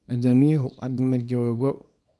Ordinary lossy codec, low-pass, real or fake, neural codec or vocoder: none; none; fake; codec, 24 kHz, 0.9 kbps, WavTokenizer, small release